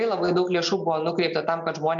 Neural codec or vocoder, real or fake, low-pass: none; real; 7.2 kHz